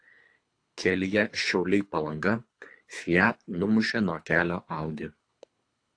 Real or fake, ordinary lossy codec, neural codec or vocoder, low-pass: fake; AAC, 48 kbps; codec, 24 kHz, 3 kbps, HILCodec; 9.9 kHz